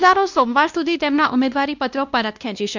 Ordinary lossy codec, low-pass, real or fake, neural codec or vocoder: none; 7.2 kHz; fake; codec, 16 kHz, 1 kbps, X-Codec, WavLM features, trained on Multilingual LibriSpeech